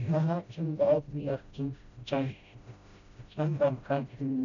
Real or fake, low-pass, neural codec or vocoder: fake; 7.2 kHz; codec, 16 kHz, 0.5 kbps, FreqCodec, smaller model